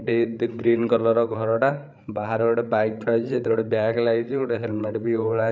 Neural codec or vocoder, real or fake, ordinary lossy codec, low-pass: codec, 16 kHz, 8 kbps, FreqCodec, larger model; fake; none; none